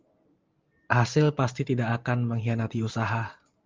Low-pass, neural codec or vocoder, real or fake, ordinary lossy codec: 7.2 kHz; none; real; Opus, 24 kbps